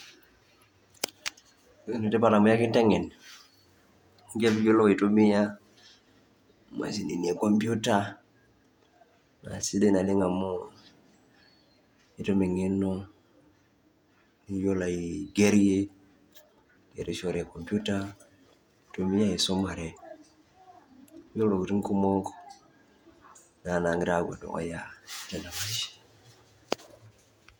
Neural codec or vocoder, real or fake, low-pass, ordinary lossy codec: vocoder, 48 kHz, 128 mel bands, Vocos; fake; 19.8 kHz; none